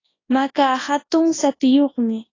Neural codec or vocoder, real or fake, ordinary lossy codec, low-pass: codec, 24 kHz, 0.9 kbps, WavTokenizer, large speech release; fake; AAC, 32 kbps; 7.2 kHz